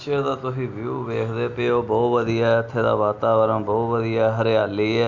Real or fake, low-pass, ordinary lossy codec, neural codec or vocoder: real; 7.2 kHz; none; none